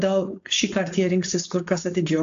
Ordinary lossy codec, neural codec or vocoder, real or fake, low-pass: AAC, 64 kbps; codec, 16 kHz, 4.8 kbps, FACodec; fake; 7.2 kHz